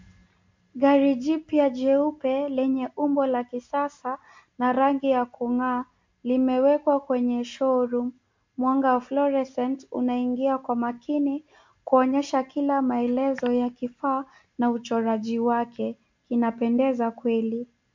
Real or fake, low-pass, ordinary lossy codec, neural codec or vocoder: real; 7.2 kHz; MP3, 48 kbps; none